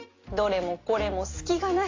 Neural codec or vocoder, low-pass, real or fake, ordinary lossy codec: none; 7.2 kHz; real; AAC, 32 kbps